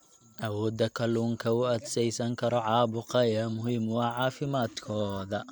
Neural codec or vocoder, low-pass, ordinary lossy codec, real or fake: none; 19.8 kHz; none; real